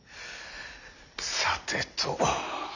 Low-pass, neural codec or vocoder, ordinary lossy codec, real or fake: 7.2 kHz; none; none; real